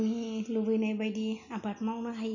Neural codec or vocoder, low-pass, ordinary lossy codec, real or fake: none; 7.2 kHz; none; real